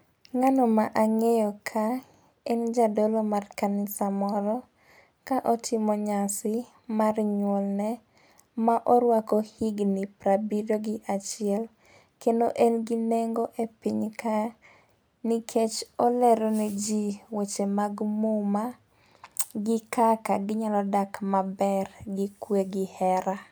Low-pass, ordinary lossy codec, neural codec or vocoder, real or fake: none; none; none; real